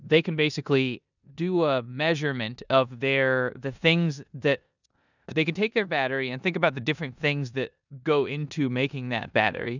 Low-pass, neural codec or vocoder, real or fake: 7.2 kHz; codec, 16 kHz in and 24 kHz out, 0.9 kbps, LongCat-Audio-Codec, four codebook decoder; fake